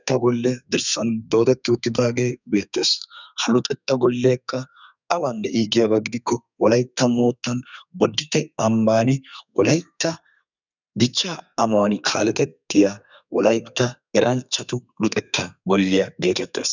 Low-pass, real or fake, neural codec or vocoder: 7.2 kHz; fake; codec, 32 kHz, 1.9 kbps, SNAC